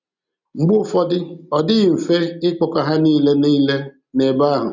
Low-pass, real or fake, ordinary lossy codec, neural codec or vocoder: 7.2 kHz; real; none; none